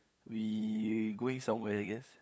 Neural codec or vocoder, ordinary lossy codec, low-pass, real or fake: codec, 16 kHz, 8 kbps, FunCodec, trained on LibriTTS, 25 frames a second; none; none; fake